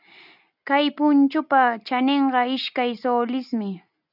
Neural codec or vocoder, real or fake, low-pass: none; real; 5.4 kHz